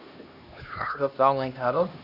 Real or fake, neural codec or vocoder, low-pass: fake; codec, 16 kHz, 1 kbps, X-Codec, HuBERT features, trained on LibriSpeech; 5.4 kHz